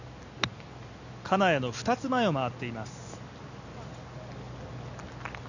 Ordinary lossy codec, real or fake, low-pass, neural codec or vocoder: none; real; 7.2 kHz; none